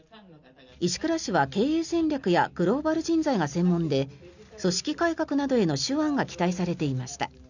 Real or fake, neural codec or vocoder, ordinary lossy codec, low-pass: real; none; none; 7.2 kHz